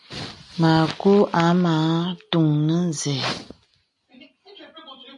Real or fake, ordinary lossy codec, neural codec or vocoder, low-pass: real; MP3, 48 kbps; none; 10.8 kHz